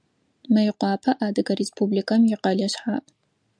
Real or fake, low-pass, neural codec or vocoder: real; 9.9 kHz; none